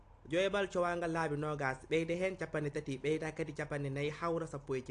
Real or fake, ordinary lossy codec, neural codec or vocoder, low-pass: real; AAC, 48 kbps; none; 9.9 kHz